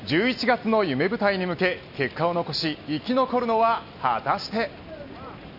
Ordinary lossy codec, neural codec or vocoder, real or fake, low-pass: none; none; real; 5.4 kHz